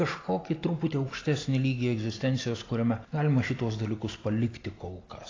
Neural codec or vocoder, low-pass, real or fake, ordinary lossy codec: none; 7.2 kHz; real; AAC, 32 kbps